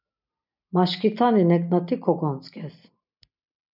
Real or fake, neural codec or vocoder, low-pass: real; none; 5.4 kHz